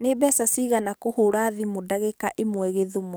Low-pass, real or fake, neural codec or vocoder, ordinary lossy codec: none; fake; codec, 44.1 kHz, 7.8 kbps, DAC; none